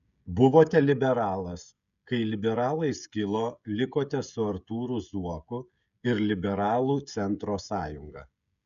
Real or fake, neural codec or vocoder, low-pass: fake; codec, 16 kHz, 16 kbps, FreqCodec, smaller model; 7.2 kHz